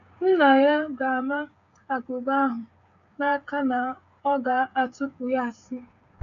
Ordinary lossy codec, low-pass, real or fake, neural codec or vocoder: none; 7.2 kHz; fake; codec, 16 kHz, 8 kbps, FreqCodec, smaller model